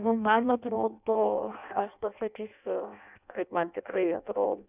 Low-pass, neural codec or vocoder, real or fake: 3.6 kHz; codec, 16 kHz in and 24 kHz out, 0.6 kbps, FireRedTTS-2 codec; fake